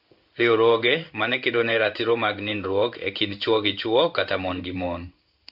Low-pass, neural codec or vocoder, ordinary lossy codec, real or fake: 5.4 kHz; codec, 16 kHz in and 24 kHz out, 1 kbps, XY-Tokenizer; none; fake